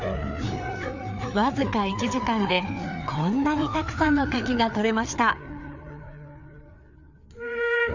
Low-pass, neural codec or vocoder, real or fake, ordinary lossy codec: 7.2 kHz; codec, 16 kHz, 4 kbps, FreqCodec, larger model; fake; none